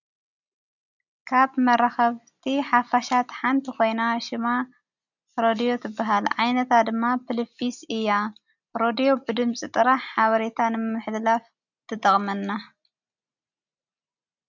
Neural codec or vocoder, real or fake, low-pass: none; real; 7.2 kHz